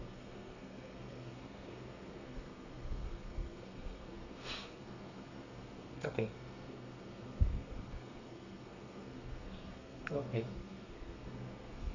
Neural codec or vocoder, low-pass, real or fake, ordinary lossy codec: codec, 32 kHz, 1.9 kbps, SNAC; 7.2 kHz; fake; none